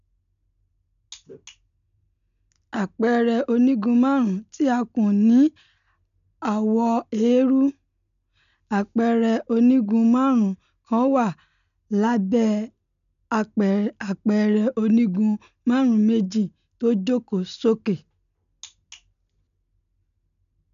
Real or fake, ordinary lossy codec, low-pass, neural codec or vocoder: real; none; 7.2 kHz; none